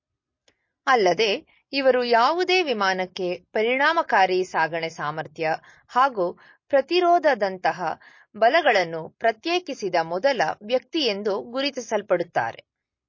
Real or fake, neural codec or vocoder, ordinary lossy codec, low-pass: real; none; MP3, 32 kbps; 7.2 kHz